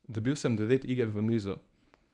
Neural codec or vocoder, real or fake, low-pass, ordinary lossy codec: codec, 24 kHz, 0.9 kbps, WavTokenizer, medium speech release version 1; fake; 10.8 kHz; none